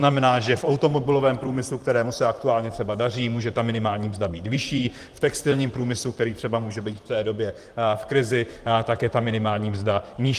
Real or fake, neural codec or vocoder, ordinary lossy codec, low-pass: fake; vocoder, 44.1 kHz, 128 mel bands, Pupu-Vocoder; Opus, 24 kbps; 14.4 kHz